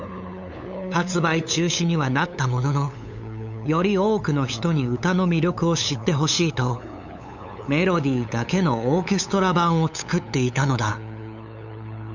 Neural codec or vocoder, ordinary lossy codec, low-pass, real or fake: codec, 16 kHz, 8 kbps, FunCodec, trained on LibriTTS, 25 frames a second; none; 7.2 kHz; fake